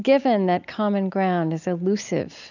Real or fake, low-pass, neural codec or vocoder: real; 7.2 kHz; none